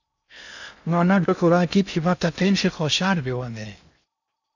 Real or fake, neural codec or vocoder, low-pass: fake; codec, 16 kHz in and 24 kHz out, 0.6 kbps, FocalCodec, streaming, 2048 codes; 7.2 kHz